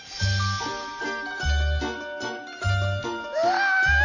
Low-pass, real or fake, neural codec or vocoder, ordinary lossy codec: 7.2 kHz; real; none; none